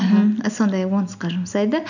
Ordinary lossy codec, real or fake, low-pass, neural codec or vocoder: none; real; 7.2 kHz; none